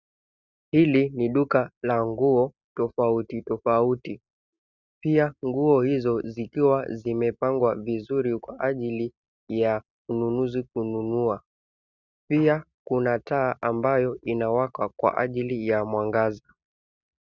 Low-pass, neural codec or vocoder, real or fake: 7.2 kHz; none; real